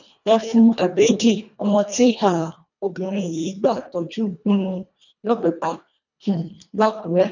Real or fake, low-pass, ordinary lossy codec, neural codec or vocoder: fake; 7.2 kHz; none; codec, 24 kHz, 1.5 kbps, HILCodec